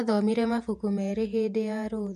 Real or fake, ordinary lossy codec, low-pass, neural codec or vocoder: fake; MP3, 64 kbps; 14.4 kHz; vocoder, 48 kHz, 128 mel bands, Vocos